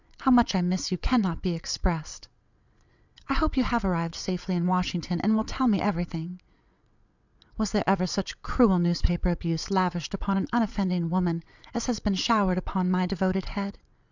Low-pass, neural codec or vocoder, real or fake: 7.2 kHz; vocoder, 22.05 kHz, 80 mel bands, WaveNeXt; fake